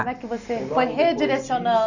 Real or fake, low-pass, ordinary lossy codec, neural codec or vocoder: real; 7.2 kHz; none; none